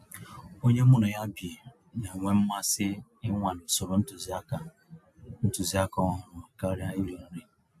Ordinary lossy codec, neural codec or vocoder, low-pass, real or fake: AAC, 96 kbps; none; 14.4 kHz; real